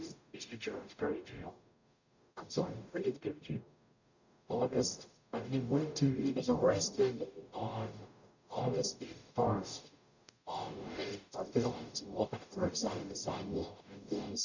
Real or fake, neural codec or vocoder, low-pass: fake; codec, 44.1 kHz, 0.9 kbps, DAC; 7.2 kHz